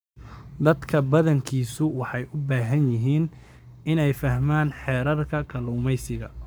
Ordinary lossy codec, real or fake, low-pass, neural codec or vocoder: none; fake; none; codec, 44.1 kHz, 7.8 kbps, Pupu-Codec